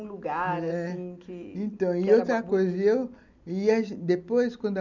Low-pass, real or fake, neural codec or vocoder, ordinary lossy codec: 7.2 kHz; real; none; none